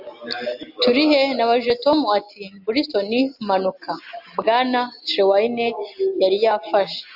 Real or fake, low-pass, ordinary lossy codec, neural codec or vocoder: real; 5.4 kHz; AAC, 48 kbps; none